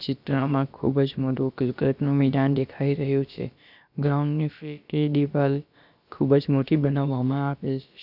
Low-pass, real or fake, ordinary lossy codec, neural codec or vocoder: 5.4 kHz; fake; none; codec, 16 kHz, about 1 kbps, DyCAST, with the encoder's durations